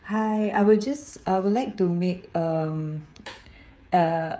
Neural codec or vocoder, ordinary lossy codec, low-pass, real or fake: codec, 16 kHz, 8 kbps, FreqCodec, smaller model; none; none; fake